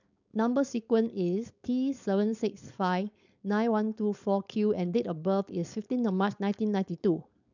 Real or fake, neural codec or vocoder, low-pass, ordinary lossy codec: fake; codec, 16 kHz, 4.8 kbps, FACodec; 7.2 kHz; none